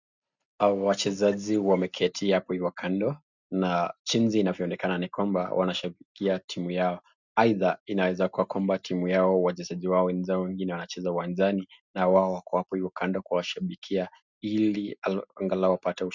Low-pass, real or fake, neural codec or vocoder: 7.2 kHz; real; none